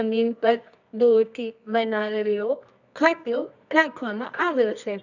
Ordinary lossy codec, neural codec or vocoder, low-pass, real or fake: none; codec, 24 kHz, 0.9 kbps, WavTokenizer, medium music audio release; 7.2 kHz; fake